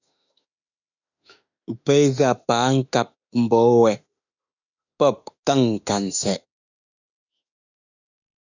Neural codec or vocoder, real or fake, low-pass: autoencoder, 48 kHz, 32 numbers a frame, DAC-VAE, trained on Japanese speech; fake; 7.2 kHz